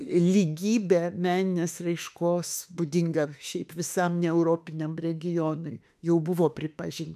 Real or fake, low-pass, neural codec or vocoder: fake; 14.4 kHz; autoencoder, 48 kHz, 32 numbers a frame, DAC-VAE, trained on Japanese speech